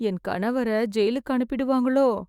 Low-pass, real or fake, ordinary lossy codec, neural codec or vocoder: 19.8 kHz; fake; Opus, 64 kbps; autoencoder, 48 kHz, 128 numbers a frame, DAC-VAE, trained on Japanese speech